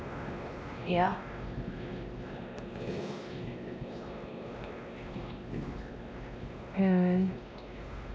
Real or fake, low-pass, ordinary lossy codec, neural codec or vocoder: fake; none; none; codec, 16 kHz, 1 kbps, X-Codec, WavLM features, trained on Multilingual LibriSpeech